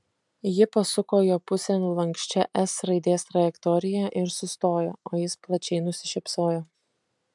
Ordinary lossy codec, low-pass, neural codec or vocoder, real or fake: MP3, 96 kbps; 10.8 kHz; none; real